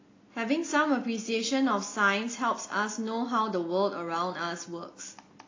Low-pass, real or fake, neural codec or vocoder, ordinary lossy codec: 7.2 kHz; real; none; AAC, 32 kbps